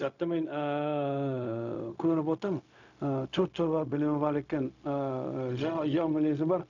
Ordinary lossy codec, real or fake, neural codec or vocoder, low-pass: none; fake; codec, 16 kHz, 0.4 kbps, LongCat-Audio-Codec; 7.2 kHz